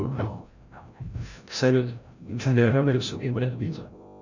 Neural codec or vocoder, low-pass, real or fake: codec, 16 kHz, 0.5 kbps, FreqCodec, larger model; 7.2 kHz; fake